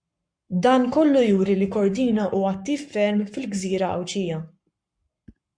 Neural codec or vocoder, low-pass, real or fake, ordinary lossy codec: codec, 44.1 kHz, 7.8 kbps, Pupu-Codec; 9.9 kHz; fake; MP3, 64 kbps